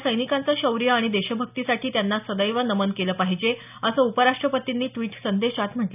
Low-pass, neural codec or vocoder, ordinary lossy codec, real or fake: 3.6 kHz; none; none; real